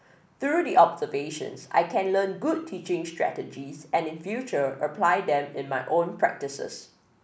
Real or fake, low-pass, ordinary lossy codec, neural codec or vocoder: real; none; none; none